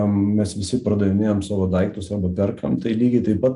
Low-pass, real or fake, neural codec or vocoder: 10.8 kHz; real; none